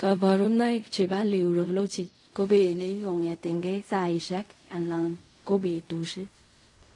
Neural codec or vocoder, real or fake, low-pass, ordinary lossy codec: codec, 16 kHz in and 24 kHz out, 0.4 kbps, LongCat-Audio-Codec, fine tuned four codebook decoder; fake; 10.8 kHz; AAC, 48 kbps